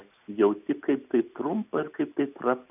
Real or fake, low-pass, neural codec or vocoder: real; 3.6 kHz; none